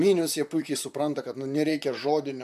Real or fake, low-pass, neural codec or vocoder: fake; 14.4 kHz; vocoder, 44.1 kHz, 128 mel bands, Pupu-Vocoder